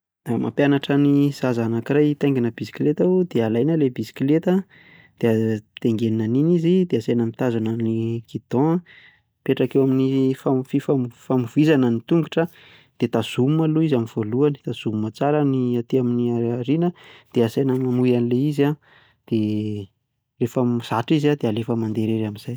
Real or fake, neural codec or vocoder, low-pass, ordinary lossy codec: real; none; none; none